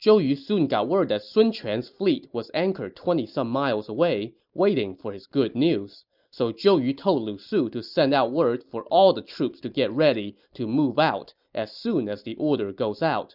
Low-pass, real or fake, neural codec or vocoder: 5.4 kHz; real; none